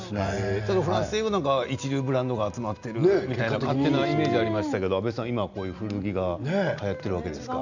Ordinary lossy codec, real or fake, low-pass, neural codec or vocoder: none; real; 7.2 kHz; none